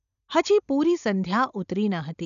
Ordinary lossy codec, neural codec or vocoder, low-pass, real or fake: none; none; 7.2 kHz; real